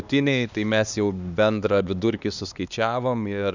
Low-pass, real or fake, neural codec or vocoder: 7.2 kHz; fake; codec, 16 kHz, 2 kbps, X-Codec, HuBERT features, trained on LibriSpeech